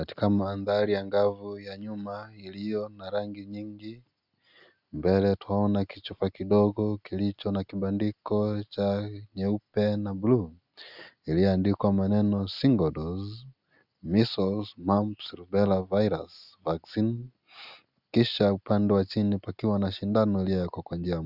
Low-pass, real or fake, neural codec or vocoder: 5.4 kHz; real; none